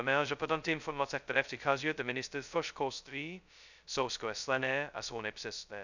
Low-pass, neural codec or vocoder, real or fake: 7.2 kHz; codec, 16 kHz, 0.2 kbps, FocalCodec; fake